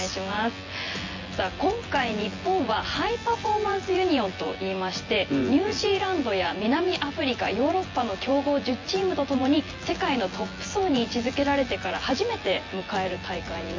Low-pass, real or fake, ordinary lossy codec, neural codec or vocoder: 7.2 kHz; fake; MP3, 32 kbps; vocoder, 24 kHz, 100 mel bands, Vocos